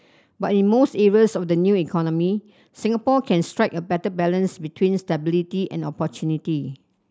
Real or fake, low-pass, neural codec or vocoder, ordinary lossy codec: real; none; none; none